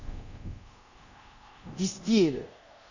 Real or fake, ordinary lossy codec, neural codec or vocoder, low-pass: fake; none; codec, 24 kHz, 0.5 kbps, DualCodec; 7.2 kHz